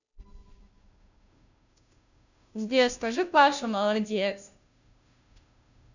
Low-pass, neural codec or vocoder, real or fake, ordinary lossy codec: 7.2 kHz; codec, 16 kHz, 0.5 kbps, FunCodec, trained on Chinese and English, 25 frames a second; fake; none